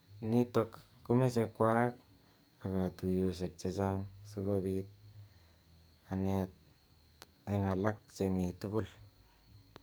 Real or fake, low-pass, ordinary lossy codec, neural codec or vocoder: fake; none; none; codec, 44.1 kHz, 2.6 kbps, SNAC